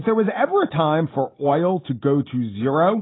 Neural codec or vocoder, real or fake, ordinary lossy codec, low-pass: none; real; AAC, 16 kbps; 7.2 kHz